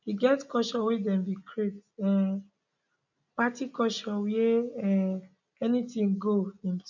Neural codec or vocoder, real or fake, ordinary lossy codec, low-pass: none; real; none; 7.2 kHz